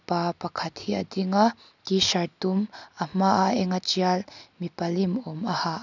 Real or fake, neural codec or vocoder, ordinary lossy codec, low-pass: real; none; none; 7.2 kHz